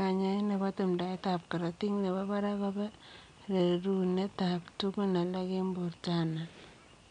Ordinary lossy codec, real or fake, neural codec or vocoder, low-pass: MP3, 64 kbps; real; none; 9.9 kHz